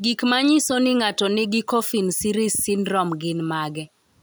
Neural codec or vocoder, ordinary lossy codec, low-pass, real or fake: none; none; none; real